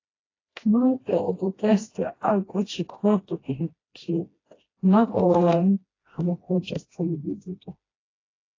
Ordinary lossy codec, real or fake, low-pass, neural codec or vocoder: AAC, 32 kbps; fake; 7.2 kHz; codec, 16 kHz, 1 kbps, FreqCodec, smaller model